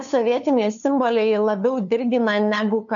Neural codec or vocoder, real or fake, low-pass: codec, 16 kHz, 2 kbps, FunCodec, trained on LibriTTS, 25 frames a second; fake; 7.2 kHz